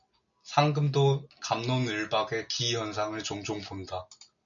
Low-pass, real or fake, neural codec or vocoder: 7.2 kHz; real; none